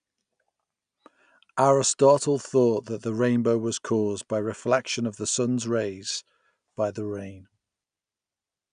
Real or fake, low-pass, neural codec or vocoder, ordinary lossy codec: real; 9.9 kHz; none; none